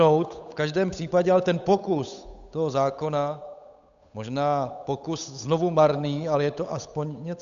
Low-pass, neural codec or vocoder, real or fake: 7.2 kHz; codec, 16 kHz, 8 kbps, FunCodec, trained on Chinese and English, 25 frames a second; fake